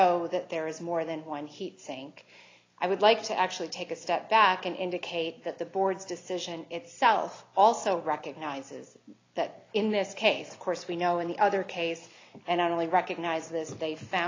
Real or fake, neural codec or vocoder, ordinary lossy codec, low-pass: real; none; AAC, 32 kbps; 7.2 kHz